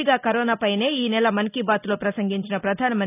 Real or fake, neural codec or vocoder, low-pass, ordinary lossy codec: real; none; 3.6 kHz; none